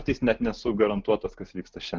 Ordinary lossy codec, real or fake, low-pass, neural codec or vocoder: Opus, 32 kbps; real; 7.2 kHz; none